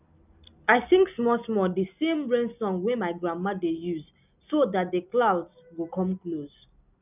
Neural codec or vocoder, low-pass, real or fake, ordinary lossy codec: none; 3.6 kHz; real; none